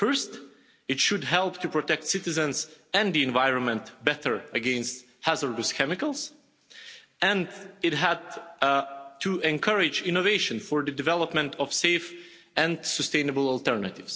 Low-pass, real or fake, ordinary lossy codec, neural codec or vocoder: none; real; none; none